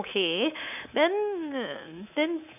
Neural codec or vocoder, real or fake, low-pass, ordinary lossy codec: codec, 24 kHz, 3.1 kbps, DualCodec; fake; 3.6 kHz; none